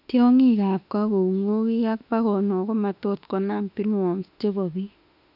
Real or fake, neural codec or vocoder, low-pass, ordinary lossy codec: fake; autoencoder, 48 kHz, 32 numbers a frame, DAC-VAE, trained on Japanese speech; 5.4 kHz; none